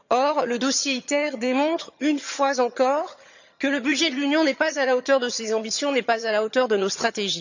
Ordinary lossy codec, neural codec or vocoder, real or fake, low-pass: none; vocoder, 22.05 kHz, 80 mel bands, HiFi-GAN; fake; 7.2 kHz